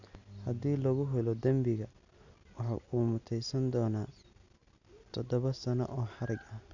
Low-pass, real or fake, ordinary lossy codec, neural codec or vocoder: 7.2 kHz; real; none; none